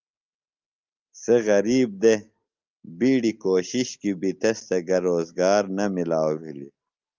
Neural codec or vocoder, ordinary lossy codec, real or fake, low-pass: none; Opus, 24 kbps; real; 7.2 kHz